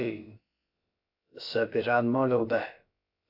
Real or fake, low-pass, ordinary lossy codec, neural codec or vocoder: fake; 5.4 kHz; AAC, 48 kbps; codec, 16 kHz, about 1 kbps, DyCAST, with the encoder's durations